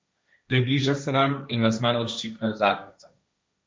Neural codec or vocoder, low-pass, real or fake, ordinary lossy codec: codec, 16 kHz, 1.1 kbps, Voila-Tokenizer; none; fake; none